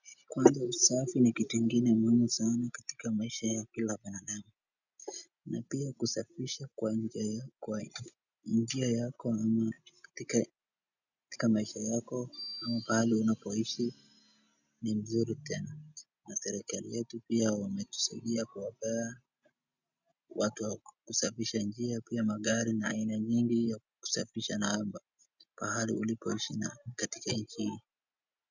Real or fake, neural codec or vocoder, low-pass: real; none; 7.2 kHz